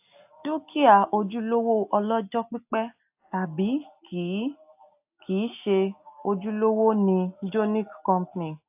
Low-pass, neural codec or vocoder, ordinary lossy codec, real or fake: 3.6 kHz; none; AAC, 32 kbps; real